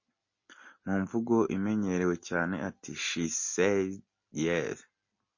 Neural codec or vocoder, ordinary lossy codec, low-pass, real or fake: none; MP3, 32 kbps; 7.2 kHz; real